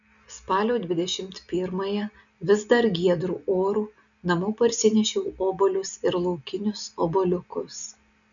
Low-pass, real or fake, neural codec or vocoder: 7.2 kHz; real; none